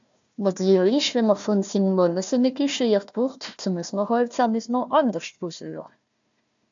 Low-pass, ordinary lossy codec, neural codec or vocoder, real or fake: 7.2 kHz; AAC, 64 kbps; codec, 16 kHz, 1 kbps, FunCodec, trained on Chinese and English, 50 frames a second; fake